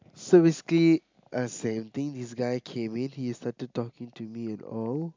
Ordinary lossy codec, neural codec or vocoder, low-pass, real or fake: MP3, 64 kbps; none; 7.2 kHz; real